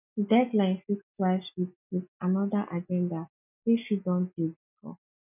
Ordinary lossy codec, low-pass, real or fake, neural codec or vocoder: none; 3.6 kHz; real; none